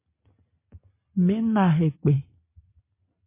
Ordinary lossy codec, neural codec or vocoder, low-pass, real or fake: MP3, 24 kbps; none; 3.6 kHz; real